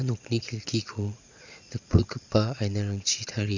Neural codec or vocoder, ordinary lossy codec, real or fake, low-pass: vocoder, 22.05 kHz, 80 mel bands, Vocos; Opus, 64 kbps; fake; 7.2 kHz